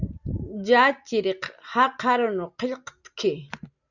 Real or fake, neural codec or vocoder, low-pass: real; none; 7.2 kHz